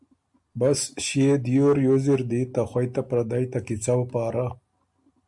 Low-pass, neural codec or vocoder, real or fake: 10.8 kHz; none; real